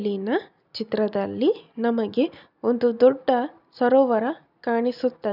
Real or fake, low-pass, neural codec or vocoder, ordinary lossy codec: real; 5.4 kHz; none; none